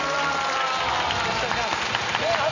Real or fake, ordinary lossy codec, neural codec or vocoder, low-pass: real; none; none; 7.2 kHz